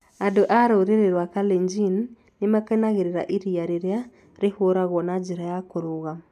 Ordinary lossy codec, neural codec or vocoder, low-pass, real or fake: none; none; 14.4 kHz; real